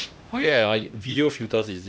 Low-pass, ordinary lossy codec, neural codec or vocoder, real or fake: none; none; codec, 16 kHz, 1 kbps, X-Codec, HuBERT features, trained on LibriSpeech; fake